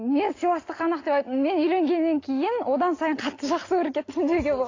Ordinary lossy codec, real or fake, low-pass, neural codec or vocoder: AAC, 32 kbps; real; 7.2 kHz; none